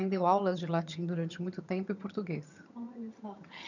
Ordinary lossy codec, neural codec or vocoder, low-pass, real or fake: none; vocoder, 22.05 kHz, 80 mel bands, HiFi-GAN; 7.2 kHz; fake